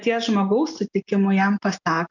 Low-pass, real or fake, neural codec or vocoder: 7.2 kHz; real; none